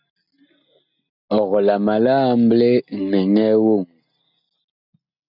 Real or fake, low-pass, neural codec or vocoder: real; 5.4 kHz; none